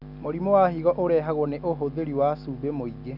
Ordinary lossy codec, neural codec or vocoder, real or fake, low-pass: none; none; real; 5.4 kHz